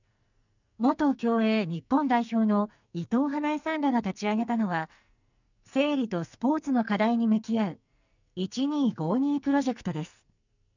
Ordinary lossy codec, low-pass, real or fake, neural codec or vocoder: none; 7.2 kHz; fake; codec, 44.1 kHz, 2.6 kbps, SNAC